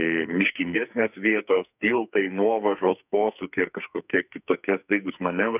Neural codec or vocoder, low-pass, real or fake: codec, 44.1 kHz, 2.6 kbps, SNAC; 3.6 kHz; fake